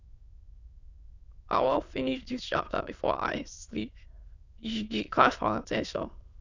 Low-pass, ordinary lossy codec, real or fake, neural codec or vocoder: 7.2 kHz; none; fake; autoencoder, 22.05 kHz, a latent of 192 numbers a frame, VITS, trained on many speakers